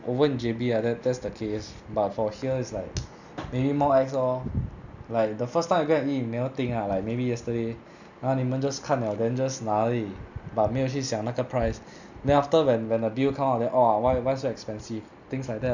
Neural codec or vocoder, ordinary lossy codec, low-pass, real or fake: none; none; 7.2 kHz; real